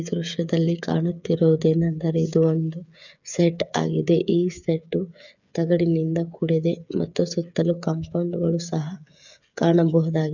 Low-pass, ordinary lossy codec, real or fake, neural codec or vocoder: 7.2 kHz; none; fake; codec, 16 kHz, 8 kbps, FreqCodec, smaller model